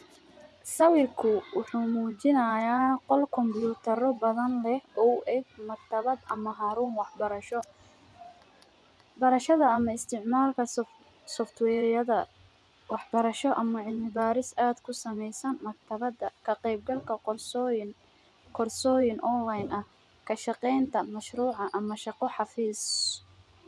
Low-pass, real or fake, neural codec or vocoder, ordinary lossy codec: none; fake; vocoder, 24 kHz, 100 mel bands, Vocos; none